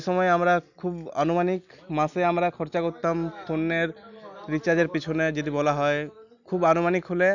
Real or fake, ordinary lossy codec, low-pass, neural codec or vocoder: real; none; 7.2 kHz; none